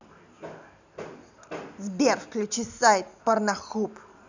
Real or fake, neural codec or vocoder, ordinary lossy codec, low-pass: real; none; none; 7.2 kHz